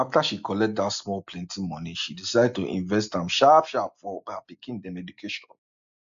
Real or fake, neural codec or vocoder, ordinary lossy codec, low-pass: real; none; AAC, 64 kbps; 7.2 kHz